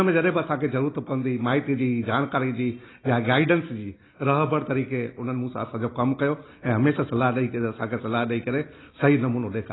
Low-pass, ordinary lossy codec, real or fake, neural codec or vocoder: 7.2 kHz; AAC, 16 kbps; real; none